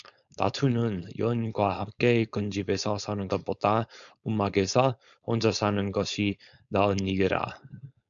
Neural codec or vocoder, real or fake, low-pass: codec, 16 kHz, 4.8 kbps, FACodec; fake; 7.2 kHz